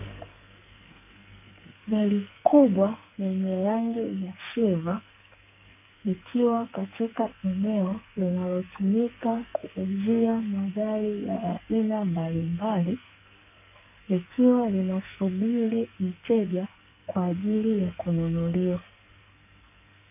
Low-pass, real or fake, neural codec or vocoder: 3.6 kHz; fake; codec, 44.1 kHz, 2.6 kbps, SNAC